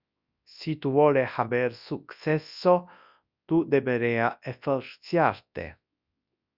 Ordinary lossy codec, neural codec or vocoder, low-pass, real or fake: Opus, 64 kbps; codec, 24 kHz, 0.9 kbps, WavTokenizer, large speech release; 5.4 kHz; fake